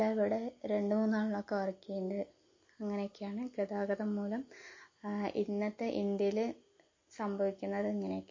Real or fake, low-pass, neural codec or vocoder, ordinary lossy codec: real; 7.2 kHz; none; MP3, 32 kbps